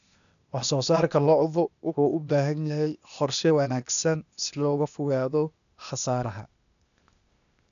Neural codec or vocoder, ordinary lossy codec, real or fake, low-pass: codec, 16 kHz, 0.8 kbps, ZipCodec; none; fake; 7.2 kHz